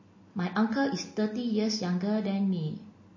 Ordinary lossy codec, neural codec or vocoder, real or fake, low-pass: MP3, 32 kbps; none; real; 7.2 kHz